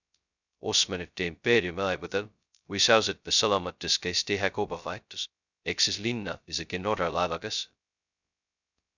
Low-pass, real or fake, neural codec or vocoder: 7.2 kHz; fake; codec, 16 kHz, 0.2 kbps, FocalCodec